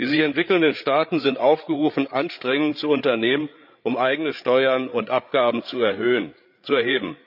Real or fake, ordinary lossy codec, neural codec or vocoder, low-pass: fake; none; codec, 16 kHz, 8 kbps, FreqCodec, larger model; 5.4 kHz